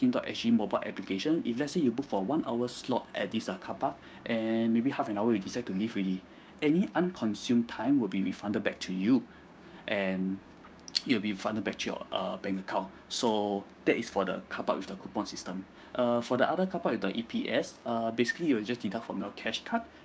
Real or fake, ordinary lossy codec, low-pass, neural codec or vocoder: fake; none; none; codec, 16 kHz, 6 kbps, DAC